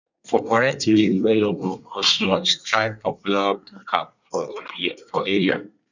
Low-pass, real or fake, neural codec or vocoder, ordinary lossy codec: 7.2 kHz; fake; codec, 24 kHz, 1 kbps, SNAC; none